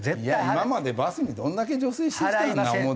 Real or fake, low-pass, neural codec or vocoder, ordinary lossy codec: real; none; none; none